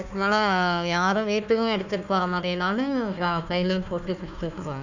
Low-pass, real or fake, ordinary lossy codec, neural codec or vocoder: 7.2 kHz; fake; none; codec, 16 kHz, 1 kbps, FunCodec, trained on Chinese and English, 50 frames a second